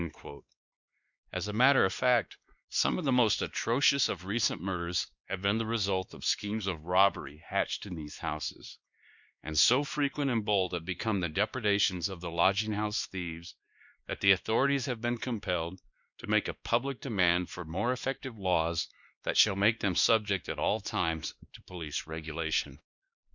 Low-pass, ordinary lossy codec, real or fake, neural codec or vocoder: 7.2 kHz; Opus, 64 kbps; fake; codec, 16 kHz, 2 kbps, X-Codec, WavLM features, trained on Multilingual LibriSpeech